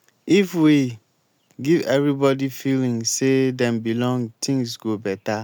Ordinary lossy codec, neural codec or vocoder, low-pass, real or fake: none; none; none; real